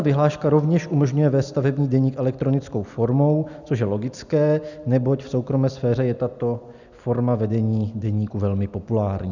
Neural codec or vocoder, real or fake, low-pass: none; real; 7.2 kHz